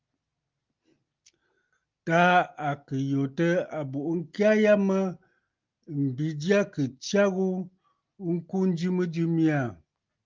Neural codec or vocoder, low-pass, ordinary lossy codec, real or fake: none; 7.2 kHz; Opus, 32 kbps; real